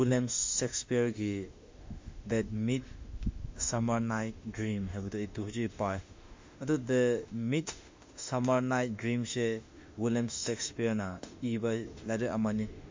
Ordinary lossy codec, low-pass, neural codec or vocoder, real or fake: MP3, 48 kbps; 7.2 kHz; autoencoder, 48 kHz, 32 numbers a frame, DAC-VAE, trained on Japanese speech; fake